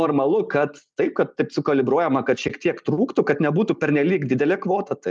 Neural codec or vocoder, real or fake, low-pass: none; real; 9.9 kHz